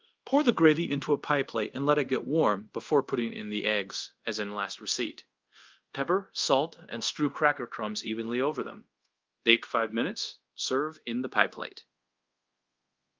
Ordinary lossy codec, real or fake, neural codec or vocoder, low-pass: Opus, 32 kbps; fake; codec, 24 kHz, 0.5 kbps, DualCodec; 7.2 kHz